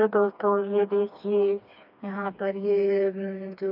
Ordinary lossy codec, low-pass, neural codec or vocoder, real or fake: none; 5.4 kHz; codec, 16 kHz, 2 kbps, FreqCodec, smaller model; fake